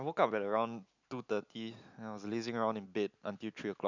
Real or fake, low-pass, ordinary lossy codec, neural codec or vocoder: real; 7.2 kHz; none; none